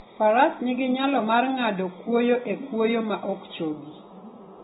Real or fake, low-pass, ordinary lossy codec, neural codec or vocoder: real; 9.9 kHz; AAC, 16 kbps; none